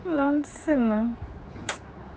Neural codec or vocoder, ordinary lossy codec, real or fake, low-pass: codec, 16 kHz, 4 kbps, X-Codec, HuBERT features, trained on general audio; none; fake; none